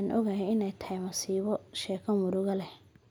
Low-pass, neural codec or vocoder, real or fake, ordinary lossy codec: 19.8 kHz; none; real; none